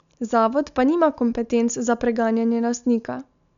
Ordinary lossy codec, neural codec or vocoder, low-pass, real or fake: none; none; 7.2 kHz; real